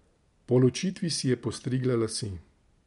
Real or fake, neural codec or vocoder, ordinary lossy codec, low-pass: real; none; MP3, 64 kbps; 10.8 kHz